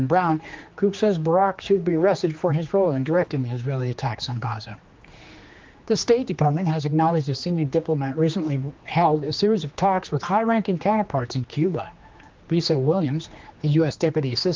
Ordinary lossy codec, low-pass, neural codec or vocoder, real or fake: Opus, 24 kbps; 7.2 kHz; codec, 16 kHz, 2 kbps, X-Codec, HuBERT features, trained on general audio; fake